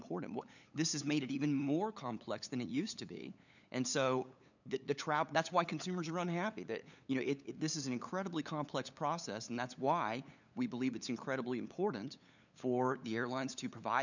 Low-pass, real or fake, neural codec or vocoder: 7.2 kHz; fake; codec, 16 kHz, 8 kbps, FunCodec, trained on LibriTTS, 25 frames a second